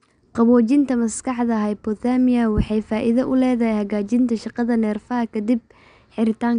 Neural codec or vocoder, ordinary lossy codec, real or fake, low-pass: none; none; real; 9.9 kHz